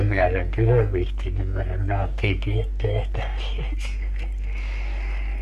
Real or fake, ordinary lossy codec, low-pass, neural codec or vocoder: fake; none; 14.4 kHz; codec, 44.1 kHz, 3.4 kbps, Pupu-Codec